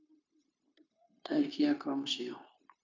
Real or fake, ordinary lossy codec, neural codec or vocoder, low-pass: fake; MP3, 64 kbps; codec, 16 kHz, 0.9 kbps, LongCat-Audio-Codec; 7.2 kHz